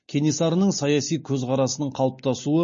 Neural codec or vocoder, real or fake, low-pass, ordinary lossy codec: none; real; 7.2 kHz; MP3, 32 kbps